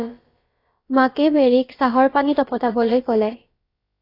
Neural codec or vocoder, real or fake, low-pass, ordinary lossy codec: codec, 16 kHz, about 1 kbps, DyCAST, with the encoder's durations; fake; 5.4 kHz; AAC, 32 kbps